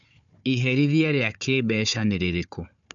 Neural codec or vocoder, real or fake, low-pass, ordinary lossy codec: codec, 16 kHz, 4 kbps, FunCodec, trained on Chinese and English, 50 frames a second; fake; 7.2 kHz; none